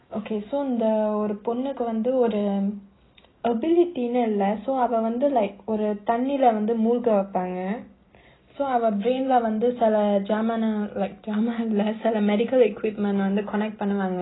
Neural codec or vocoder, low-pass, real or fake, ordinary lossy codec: none; 7.2 kHz; real; AAC, 16 kbps